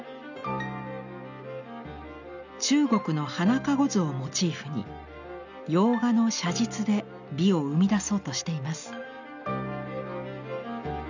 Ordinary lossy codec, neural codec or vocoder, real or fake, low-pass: none; none; real; 7.2 kHz